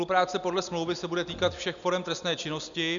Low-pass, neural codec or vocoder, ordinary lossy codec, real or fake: 7.2 kHz; none; MP3, 96 kbps; real